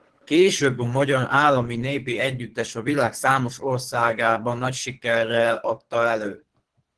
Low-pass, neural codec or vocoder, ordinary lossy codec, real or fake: 10.8 kHz; codec, 24 kHz, 3 kbps, HILCodec; Opus, 16 kbps; fake